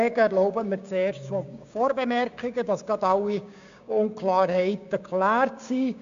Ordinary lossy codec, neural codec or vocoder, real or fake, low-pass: AAC, 64 kbps; codec, 16 kHz, 6 kbps, DAC; fake; 7.2 kHz